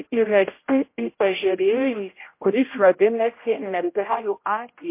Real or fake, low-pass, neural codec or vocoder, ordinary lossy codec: fake; 3.6 kHz; codec, 16 kHz, 0.5 kbps, X-Codec, HuBERT features, trained on general audio; MP3, 24 kbps